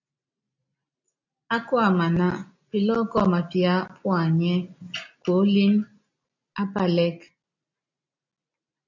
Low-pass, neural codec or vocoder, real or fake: 7.2 kHz; none; real